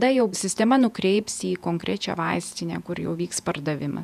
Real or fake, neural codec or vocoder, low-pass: fake; vocoder, 48 kHz, 128 mel bands, Vocos; 14.4 kHz